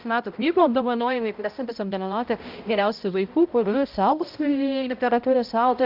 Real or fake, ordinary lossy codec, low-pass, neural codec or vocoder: fake; Opus, 32 kbps; 5.4 kHz; codec, 16 kHz, 0.5 kbps, X-Codec, HuBERT features, trained on balanced general audio